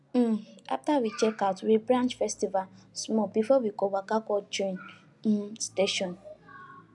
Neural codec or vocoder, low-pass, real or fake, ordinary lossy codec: none; 10.8 kHz; real; none